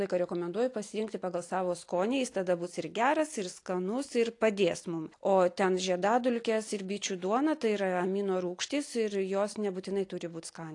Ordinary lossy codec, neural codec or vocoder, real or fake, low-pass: AAC, 48 kbps; none; real; 10.8 kHz